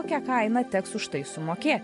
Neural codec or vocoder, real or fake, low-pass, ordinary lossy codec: none; real; 14.4 kHz; MP3, 48 kbps